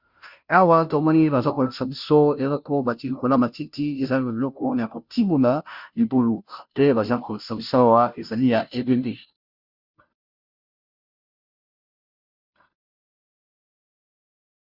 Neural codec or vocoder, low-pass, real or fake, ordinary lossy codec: codec, 16 kHz, 0.5 kbps, FunCodec, trained on Chinese and English, 25 frames a second; 5.4 kHz; fake; Opus, 64 kbps